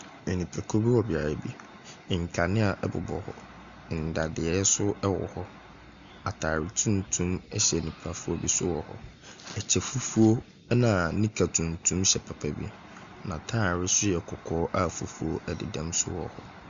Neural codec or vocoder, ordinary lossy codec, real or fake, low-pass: none; Opus, 64 kbps; real; 7.2 kHz